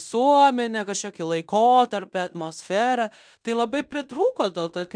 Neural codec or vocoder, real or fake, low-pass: codec, 16 kHz in and 24 kHz out, 0.9 kbps, LongCat-Audio-Codec, fine tuned four codebook decoder; fake; 9.9 kHz